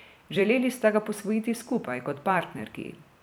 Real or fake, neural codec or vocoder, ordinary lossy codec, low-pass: fake; vocoder, 44.1 kHz, 128 mel bands every 256 samples, BigVGAN v2; none; none